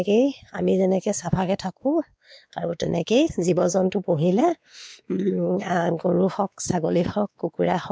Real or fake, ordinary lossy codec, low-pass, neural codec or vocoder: fake; none; none; codec, 16 kHz, 2 kbps, X-Codec, WavLM features, trained on Multilingual LibriSpeech